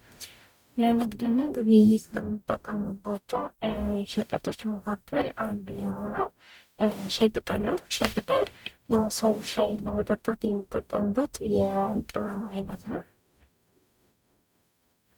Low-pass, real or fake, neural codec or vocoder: 19.8 kHz; fake; codec, 44.1 kHz, 0.9 kbps, DAC